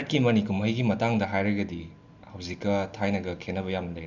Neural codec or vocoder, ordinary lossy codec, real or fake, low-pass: none; none; real; 7.2 kHz